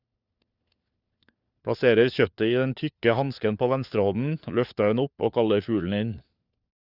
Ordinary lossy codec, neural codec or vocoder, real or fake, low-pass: Opus, 64 kbps; codec, 16 kHz, 4 kbps, FunCodec, trained on LibriTTS, 50 frames a second; fake; 5.4 kHz